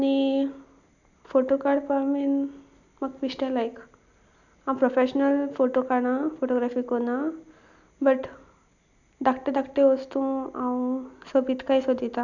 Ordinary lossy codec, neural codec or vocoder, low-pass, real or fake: none; none; 7.2 kHz; real